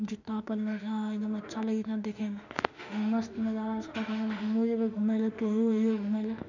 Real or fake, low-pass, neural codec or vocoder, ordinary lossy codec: fake; 7.2 kHz; autoencoder, 48 kHz, 32 numbers a frame, DAC-VAE, trained on Japanese speech; none